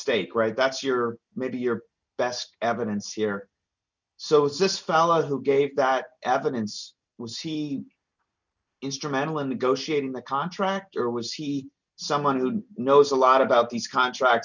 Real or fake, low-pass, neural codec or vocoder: real; 7.2 kHz; none